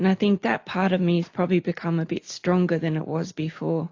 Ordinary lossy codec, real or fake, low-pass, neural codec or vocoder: AAC, 48 kbps; real; 7.2 kHz; none